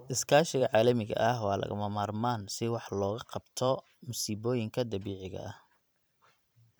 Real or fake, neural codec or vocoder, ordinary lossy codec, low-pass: fake; vocoder, 44.1 kHz, 128 mel bands every 512 samples, BigVGAN v2; none; none